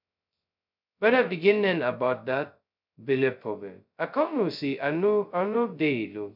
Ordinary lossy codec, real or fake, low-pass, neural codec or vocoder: none; fake; 5.4 kHz; codec, 16 kHz, 0.2 kbps, FocalCodec